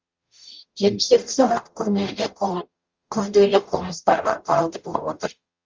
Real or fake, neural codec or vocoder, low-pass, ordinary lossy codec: fake; codec, 44.1 kHz, 0.9 kbps, DAC; 7.2 kHz; Opus, 32 kbps